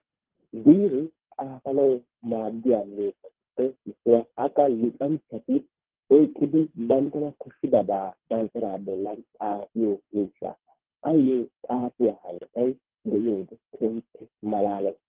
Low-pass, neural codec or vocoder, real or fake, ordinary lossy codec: 3.6 kHz; codec, 24 kHz, 3 kbps, HILCodec; fake; Opus, 16 kbps